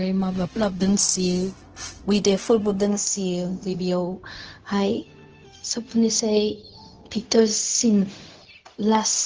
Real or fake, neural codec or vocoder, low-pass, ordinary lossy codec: fake; codec, 16 kHz, 0.4 kbps, LongCat-Audio-Codec; 7.2 kHz; Opus, 16 kbps